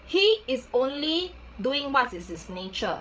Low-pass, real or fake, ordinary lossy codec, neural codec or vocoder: none; fake; none; codec, 16 kHz, 16 kbps, FreqCodec, larger model